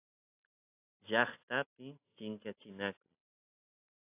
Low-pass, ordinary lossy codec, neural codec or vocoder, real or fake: 3.6 kHz; AAC, 24 kbps; none; real